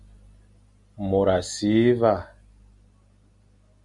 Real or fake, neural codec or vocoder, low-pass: real; none; 10.8 kHz